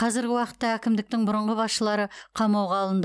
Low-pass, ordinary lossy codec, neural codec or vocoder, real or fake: none; none; none; real